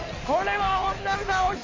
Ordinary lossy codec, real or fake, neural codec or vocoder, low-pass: MP3, 32 kbps; fake; codec, 16 kHz in and 24 kHz out, 1.1 kbps, FireRedTTS-2 codec; 7.2 kHz